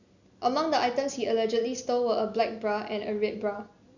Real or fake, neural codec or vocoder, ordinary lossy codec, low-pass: real; none; none; 7.2 kHz